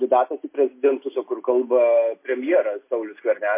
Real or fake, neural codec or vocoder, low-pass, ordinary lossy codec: real; none; 3.6 kHz; MP3, 24 kbps